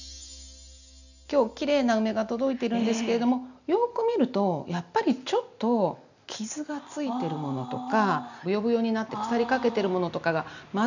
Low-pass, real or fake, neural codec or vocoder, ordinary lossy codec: 7.2 kHz; real; none; none